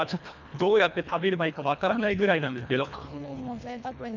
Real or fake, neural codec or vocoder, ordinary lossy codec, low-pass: fake; codec, 24 kHz, 1.5 kbps, HILCodec; none; 7.2 kHz